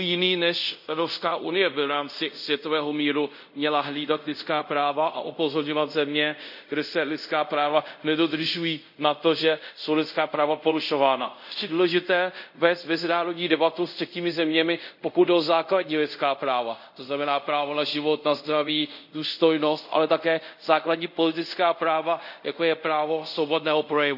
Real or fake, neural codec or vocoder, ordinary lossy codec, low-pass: fake; codec, 24 kHz, 0.5 kbps, DualCodec; none; 5.4 kHz